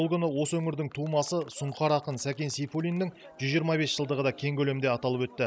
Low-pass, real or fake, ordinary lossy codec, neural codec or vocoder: none; real; none; none